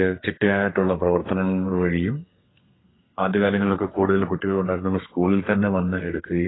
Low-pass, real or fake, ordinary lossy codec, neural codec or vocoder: 7.2 kHz; fake; AAC, 16 kbps; codec, 32 kHz, 1.9 kbps, SNAC